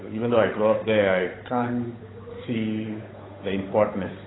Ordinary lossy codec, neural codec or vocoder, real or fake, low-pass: AAC, 16 kbps; codec, 16 kHz, 8 kbps, FunCodec, trained on Chinese and English, 25 frames a second; fake; 7.2 kHz